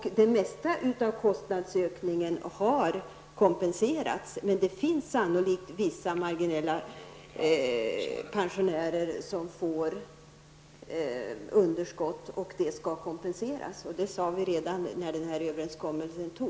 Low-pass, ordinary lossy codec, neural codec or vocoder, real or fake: none; none; none; real